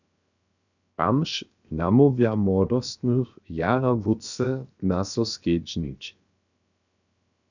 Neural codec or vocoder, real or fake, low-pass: codec, 16 kHz, 0.7 kbps, FocalCodec; fake; 7.2 kHz